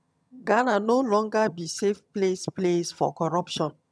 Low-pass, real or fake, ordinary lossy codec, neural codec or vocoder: none; fake; none; vocoder, 22.05 kHz, 80 mel bands, HiFi-GAN